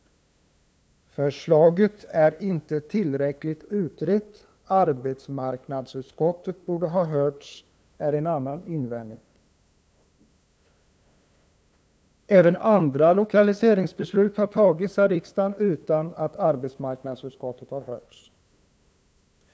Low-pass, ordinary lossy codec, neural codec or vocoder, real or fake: none; none; codec, 16 kHz, 2 kbps, FunCodec, trained on LibriTTS, 25 frames a second; fake